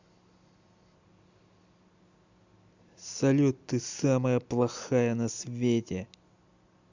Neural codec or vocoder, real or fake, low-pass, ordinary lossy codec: none; real; 7.2 kHz; Opus, 64 kbps